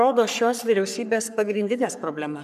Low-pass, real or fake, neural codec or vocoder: 14.4 kHz; fake; codec, 44.1 kHz, 3.4 kbps, Pupu-Codec